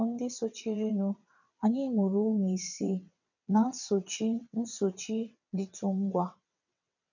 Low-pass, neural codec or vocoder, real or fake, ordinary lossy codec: 7.2 kHz; vocoder, 22.05 kHz, 80 mel bands, WaveNeXt; fake; none